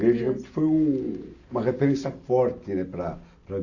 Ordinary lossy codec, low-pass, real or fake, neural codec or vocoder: none; 7.2 kHz; real; none